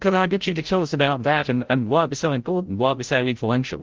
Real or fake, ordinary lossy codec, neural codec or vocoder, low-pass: fake; Opus, 16 kbps; codec, 16 kHz, 0.5 kbps, FreqCodec, larger model; 7.2 kHz